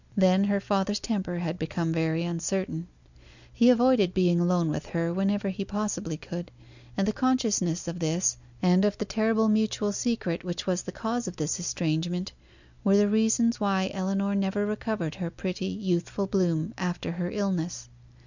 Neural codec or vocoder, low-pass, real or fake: none; 7.2 kHz; real